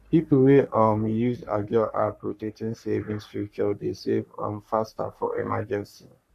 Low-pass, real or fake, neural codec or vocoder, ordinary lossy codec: 14.4 kHz; fake; codec, 44.1 kHz, 3.4 kbps, Pupu-Codec; none